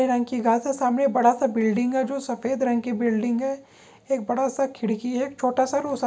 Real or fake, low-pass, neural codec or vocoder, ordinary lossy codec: real; none; none; none